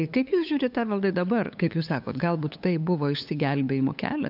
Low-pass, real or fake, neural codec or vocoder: 5.4 kHz; fake; codec, 16 kHz, 8 kbps, FunCodec, trained on LibriTTS, 25 frames a second